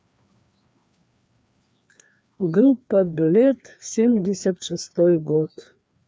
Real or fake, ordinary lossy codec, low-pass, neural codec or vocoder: fake; none; none; codec, 16 kHz, 2 kbps, FreqCodec, larger model